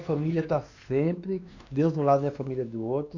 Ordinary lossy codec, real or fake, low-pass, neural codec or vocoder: none; fake; 7.2 kHz; codec, 16 kHz, 2 kbps, X-Codec, WavLM features, trained on Multilingual LibriSpeech